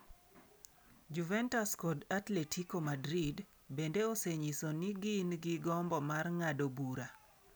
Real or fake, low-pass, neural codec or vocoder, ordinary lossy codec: real; none; none; none